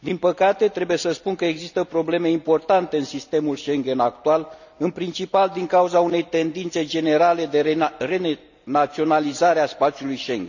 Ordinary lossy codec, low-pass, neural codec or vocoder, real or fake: none; 7.2 kHz; none; real